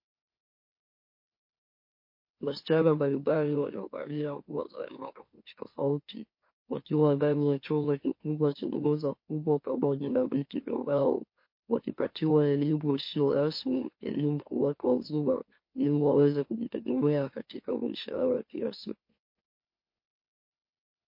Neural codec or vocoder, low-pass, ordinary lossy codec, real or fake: autoencoder, 44.1 kHz, a latent of 192 numbers a frame, MeloTTS; 5.4 kHz; MP3, 32 kbps; fake